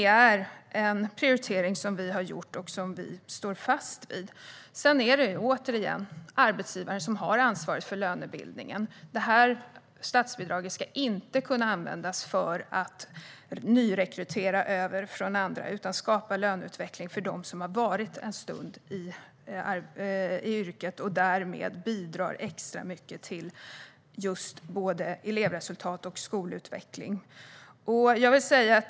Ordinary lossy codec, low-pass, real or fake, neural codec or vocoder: none; none; real; none